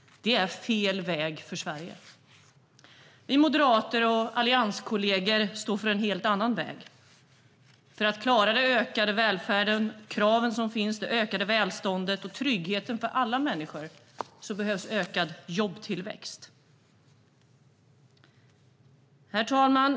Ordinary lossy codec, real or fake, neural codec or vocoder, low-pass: none; real; none; none